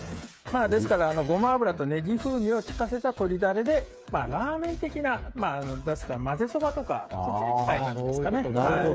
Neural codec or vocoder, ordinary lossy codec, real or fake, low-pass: codec, 16 kHz, 8 kbps, FreqCodec, smaller model; none; fake; none